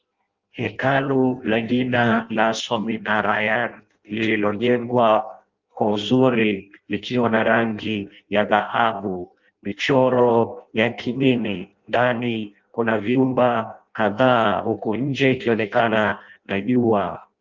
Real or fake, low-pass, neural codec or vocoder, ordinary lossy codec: fake; 7.2 kHz; codec, 16 kHz in and 24 kHz out, 0.6 kbps, FireRedTTS-2 codec; Opus, 24 kbps